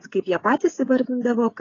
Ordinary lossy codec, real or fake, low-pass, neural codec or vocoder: AAC, 32 kbps; fake; 7.2 kHz; codec, 16 kHz, 16 kbps, FreqCodec, smaller model